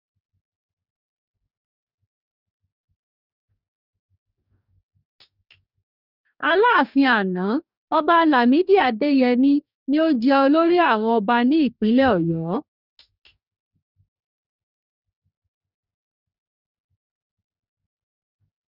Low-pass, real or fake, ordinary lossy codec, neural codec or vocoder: 5.4 kHz; fake; none; codec, 44.1 kHz, 2.6 kbps, DAC